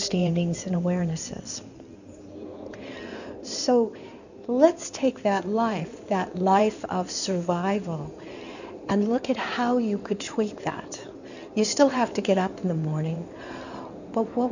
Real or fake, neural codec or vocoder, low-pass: fake; codec, 16 kHz in and 24 kHz out, 2.2 kbps, FireRedTTS-2 codec; 7.2 kHz